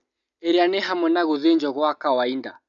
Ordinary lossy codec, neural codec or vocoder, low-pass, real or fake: MP3, 96 kbps; none; 7.2 kHz; real